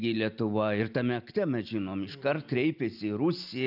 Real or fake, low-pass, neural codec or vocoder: real; 5.4 kHz; none